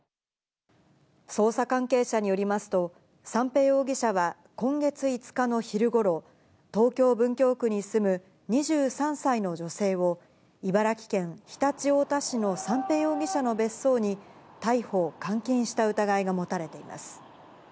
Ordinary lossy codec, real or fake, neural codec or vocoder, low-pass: none; real; none; none